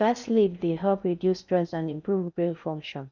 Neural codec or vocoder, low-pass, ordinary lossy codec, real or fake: codec, 16 kHz in and 24 kHz out, 0.6 kbps, FocalCodec, streaming, 4096 codes; 7.2 kHz; none; fake